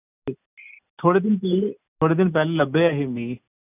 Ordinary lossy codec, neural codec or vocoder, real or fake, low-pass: none; none; real; 3.6 kHz